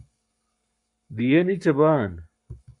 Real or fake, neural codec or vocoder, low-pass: fake; codec, 44.1 kHz, 7.8 kbps, Pupu-Codec; 10.8 kHz